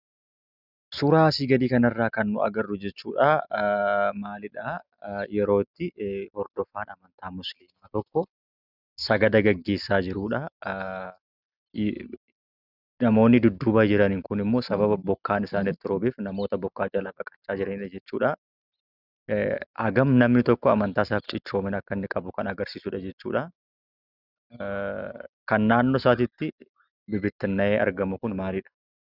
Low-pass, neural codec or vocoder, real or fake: 5.4 kHz; none; real